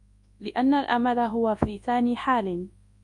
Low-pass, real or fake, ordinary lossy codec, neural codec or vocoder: 10.8 kHz; fake; Opus, 64 kbps; codec, 24 kHz, 0.9 kbps, WavTokenizer, large speech release